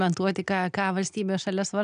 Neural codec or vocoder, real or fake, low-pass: none; real; 9.9 kHz